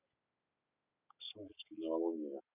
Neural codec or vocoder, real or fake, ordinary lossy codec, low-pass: none; real; none; 3.6 kHz